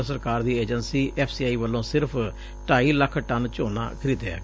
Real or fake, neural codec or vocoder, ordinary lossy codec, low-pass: real; none; none; none